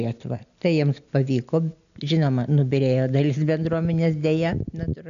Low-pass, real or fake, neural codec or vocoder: 7.2 kHz; real; none